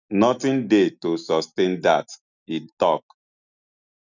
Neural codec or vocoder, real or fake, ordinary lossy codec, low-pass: none; real; none; 7.2 kHz